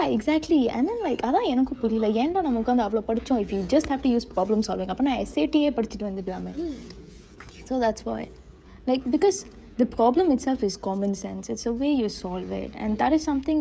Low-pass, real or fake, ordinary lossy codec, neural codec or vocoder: none; fake; none; codec, 16 kHz, 16 kbps, FreqCodec, smaller model